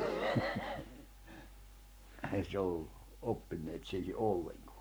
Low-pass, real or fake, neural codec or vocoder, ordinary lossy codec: none; fake; codec, 44.1 kHz, 7.8 kbps, Pupu-Codec; none